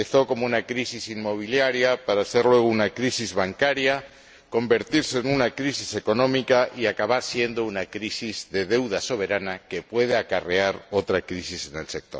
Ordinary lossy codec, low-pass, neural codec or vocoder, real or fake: none; none; none; real